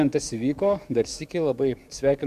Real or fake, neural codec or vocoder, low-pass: fake; codec, 44.1 kHz, 7.8 kbps, DAC; 14.4 kHz